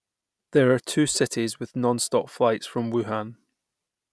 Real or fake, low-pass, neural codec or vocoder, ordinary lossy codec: real; none; none; none